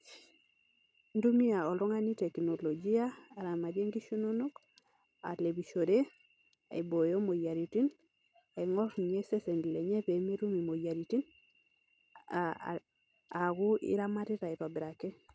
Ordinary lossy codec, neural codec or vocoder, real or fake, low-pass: none; none; real; none